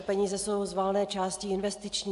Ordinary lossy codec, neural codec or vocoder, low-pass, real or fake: AAC, 64 kbps; none; 10.8 kHz; real